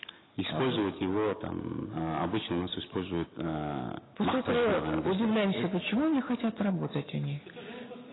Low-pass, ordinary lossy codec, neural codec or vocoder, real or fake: 7.2 kHz; AAC, 16 kbps; none; real